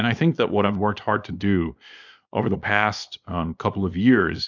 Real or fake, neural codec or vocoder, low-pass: fake; codec, 24 kHz, 0.9 kbps, WavTokenizer, small release; 7.2 kHz